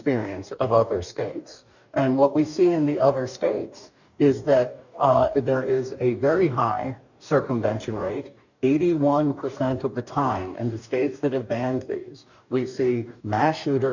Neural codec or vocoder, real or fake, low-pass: codec, 44.1 kHz, 2.6 kbps, DAC; fake; 7.2 kHz